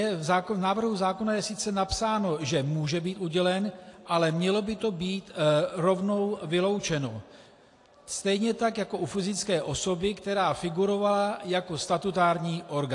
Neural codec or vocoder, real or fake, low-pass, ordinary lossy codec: none; real; 10.8 kHz; AAC, 48 kbps